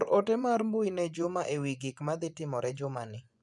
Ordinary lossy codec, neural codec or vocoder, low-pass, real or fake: none; vocoder, 24 kHz, 100 mel bands, Vocos; 10.8 kHz; fake